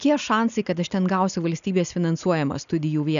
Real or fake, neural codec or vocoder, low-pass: real; none; 7.2 kHz